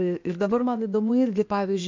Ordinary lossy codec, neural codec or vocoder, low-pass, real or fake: MP3, 64 kbps; codec, 16 kHz, 0.8 kbps, ZipCodec; 7.2 kHz; fake